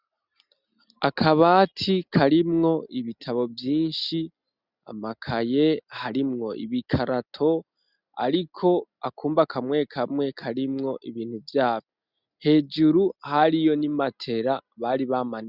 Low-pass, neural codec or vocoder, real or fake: 5.4 kHz; none; real